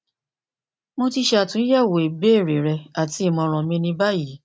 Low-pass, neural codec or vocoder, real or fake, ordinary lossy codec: none; none; real; none